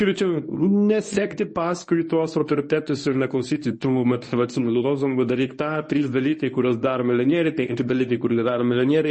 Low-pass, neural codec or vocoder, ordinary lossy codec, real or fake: 10.8 kHz; codec, 24 kHz, 0.9 kbps, WavTokenizer, medium speech release version 1; MP3, 32 kbps; fake